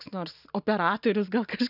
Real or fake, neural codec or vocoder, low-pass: real; none; 5.4 kHz